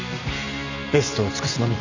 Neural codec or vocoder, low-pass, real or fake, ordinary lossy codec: none; 7.2 kHz; real; none